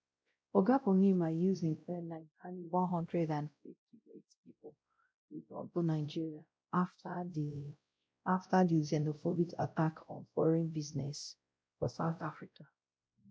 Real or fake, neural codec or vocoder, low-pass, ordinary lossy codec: fake; codec, 16 kHz, 0.5 kbps, X-Codec, WavLM features, trained on Multilingual LibriSpeech; none; none